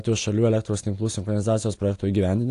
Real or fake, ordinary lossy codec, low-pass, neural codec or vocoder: real; AAC, 48 kbps; 10.8 kHz; none